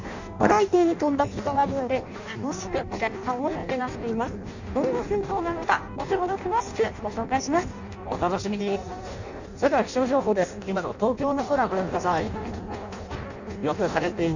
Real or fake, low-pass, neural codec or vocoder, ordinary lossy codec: fake; 7.2 kHz; codec, 16 kHz in and 24 kHz out, 0.6 kbps, FireRedTTS-2 codec; none